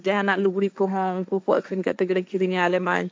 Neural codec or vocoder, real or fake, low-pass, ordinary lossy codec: codec, 16 kHz, 1.1 kbps, Voila-Tokenizer; fake; none; none